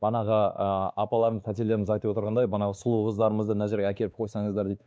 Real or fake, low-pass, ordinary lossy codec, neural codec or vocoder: fake; none; none; codec, 16 kHz, 2 kbps, X-Codec, WavLM features, trained on Multilingual LibriSpeech